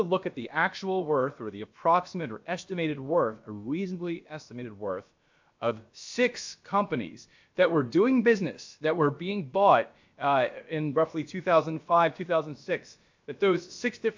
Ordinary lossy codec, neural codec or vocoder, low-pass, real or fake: MP3, 64 kbps; codec, 16 kHz, about 1 kbps, DyCAST, with the encoder's durations; 7.2 kHz; fake